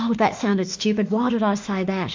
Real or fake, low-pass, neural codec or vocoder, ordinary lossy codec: fake; 7.2 kHz; autoencoder, 48 kHz, 32 numbers a frame, DAC-VAE, trained on Japanese speech; MP3, 48 kbps